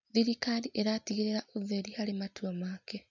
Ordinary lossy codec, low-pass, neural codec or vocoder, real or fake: none; 7.2 kHz; none; real